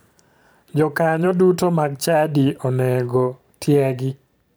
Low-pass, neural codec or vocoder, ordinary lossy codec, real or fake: none; vocoder, 44.1 kHz, 128 mel bands every 512 samples, BigVGAN v2; none; fake